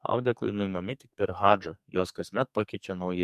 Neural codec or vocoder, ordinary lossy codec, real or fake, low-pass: codec, 44.1 kHz, 2.6 kbps, SNAC; MP3, 96 kbps; fake; 14.4 kHz